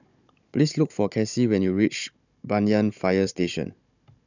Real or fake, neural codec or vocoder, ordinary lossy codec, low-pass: fake; codec, 16 kHz, 16 kbps, FunCodec, trained on Chinese and English, 50 frames a second; none; 7.2 kHz